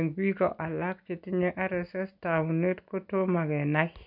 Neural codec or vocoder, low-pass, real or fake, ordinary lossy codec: none; 5.4 kHz; real; none